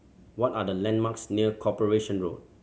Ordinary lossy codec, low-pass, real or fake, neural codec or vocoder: none; none; real; none